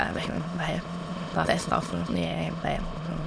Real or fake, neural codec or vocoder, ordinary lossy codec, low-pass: fake; autoencoder, 22.05 kHz, a latent of 192 numbers a frame, VITS, trained on many speakers; none; none